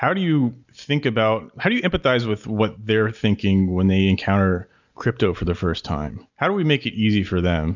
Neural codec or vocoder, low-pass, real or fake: none; 7.2 kHz; real